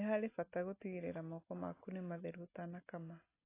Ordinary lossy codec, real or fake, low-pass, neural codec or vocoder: AAC, 16 kbps; real; 3.6 kHz; none